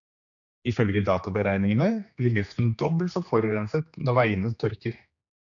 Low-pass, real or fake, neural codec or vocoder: 7.2 kHz; fake; codec, 16 kHz, 2 kbps, X-Codec, HuBERT features, trained on general audio